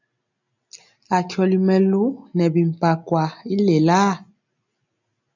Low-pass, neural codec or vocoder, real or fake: 7.2 kHz; none; real